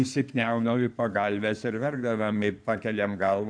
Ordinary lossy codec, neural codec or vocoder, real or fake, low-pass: AAC, 64 kbps; codec, 16 kHz in and 24 kHz out, 2.2 kbps, FireRedTTS-2 codec; fake; 9.9 kHz